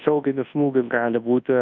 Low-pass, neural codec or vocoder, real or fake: 7.2 kHz; codec, 24 kHz, 0.9 kbps, WavTokenizer, large speech release; fake